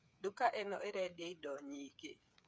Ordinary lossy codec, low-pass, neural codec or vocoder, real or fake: none; none; codec, 16 kHz, 8 kbps, FreqCodec, smaller model; fake